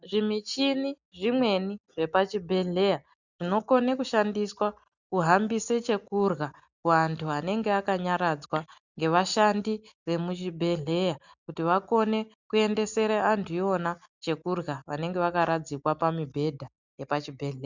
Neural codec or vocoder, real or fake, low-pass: none; real; 7.2 kHz